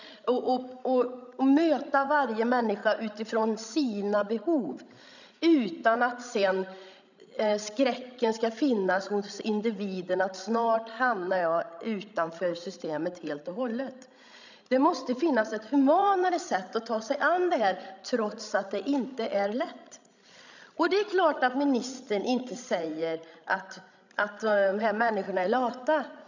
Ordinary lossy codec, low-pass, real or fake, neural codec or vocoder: none; 7.2 kHz; fake; codec, 16 kHz, 16 kbps, FreqCodec, larger model